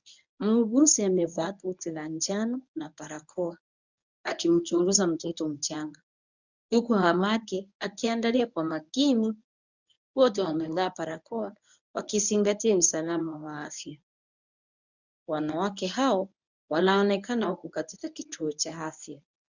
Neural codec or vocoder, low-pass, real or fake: codec, 24 kHz, 0.9 kbps, WavTokenizer, medium speech release version 1; 7.2 kHz; fake